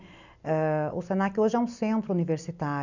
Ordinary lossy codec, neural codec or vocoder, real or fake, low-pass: none; none; real; 7.2 kHz